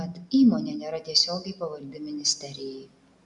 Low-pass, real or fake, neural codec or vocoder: 10.8 kHz; real; none